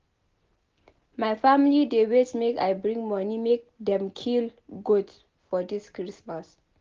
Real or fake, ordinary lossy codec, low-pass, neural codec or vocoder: real; Opus, 32 kbps; 7.2 kHz; none